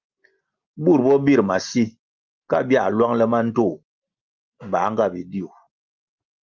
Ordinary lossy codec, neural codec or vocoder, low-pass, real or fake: Opus, 24 kbps; none; 7.2 kHz; real